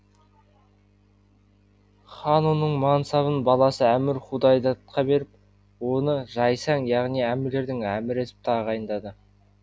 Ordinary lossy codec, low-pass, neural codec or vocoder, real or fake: none; none; none; real